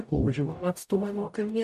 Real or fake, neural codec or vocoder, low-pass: fake; codec, 44.1 kHz, 0.9 kbps, DAC; 14.4 kHz